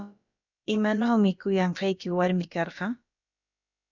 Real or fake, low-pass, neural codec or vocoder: fake; 7.2 kHz; codec, 16 kHz, about 1 kbps, DyCAST, with the encoder's durations